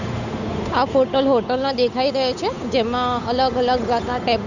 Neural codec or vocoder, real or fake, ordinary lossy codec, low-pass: codec, 16 kHz, 8 kbps, FunCodec, trained on Chinese and English, 25 frames a second; fake; none; 7.2 kHz